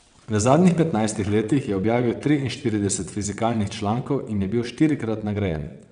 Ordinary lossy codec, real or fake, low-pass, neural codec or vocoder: none; fake; 9.9 kHz; vocoder, 22.05 kHz, 80 mel bands, WaveNeXt